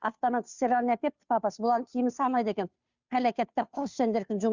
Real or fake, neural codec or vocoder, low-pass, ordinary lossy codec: fake; codec, 16 kHz, 2 kbps, FunCodec, trained on Chinese and English, 25 frames a second; 7.2 kHz; Opus, 64 kbps